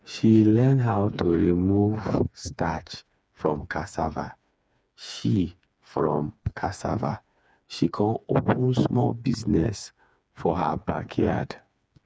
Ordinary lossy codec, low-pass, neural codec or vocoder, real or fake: none; none; codec, 16 kHz, 4 kbps, FreqCodec, smaller model; fake